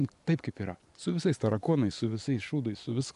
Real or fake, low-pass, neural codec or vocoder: fake; 10.8 kHz; vocoder, 24 kHz, 100 mel bands, Vocos